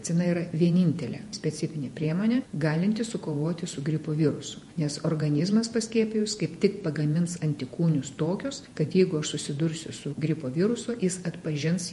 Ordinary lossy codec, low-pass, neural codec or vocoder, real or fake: MP3, 48 kbps; 14.4 kHz; vocoder, 48 kHz, 128 mel bands, Vocos; fake